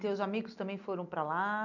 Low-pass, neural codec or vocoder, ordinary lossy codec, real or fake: 7.2 kHz; none; none; real